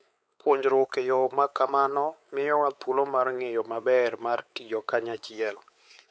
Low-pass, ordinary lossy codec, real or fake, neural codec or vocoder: none; none; fake; codec, 16 kHz, 4 kbps, X-Codec, WavLM features, trained on Multilingual LibriSpeech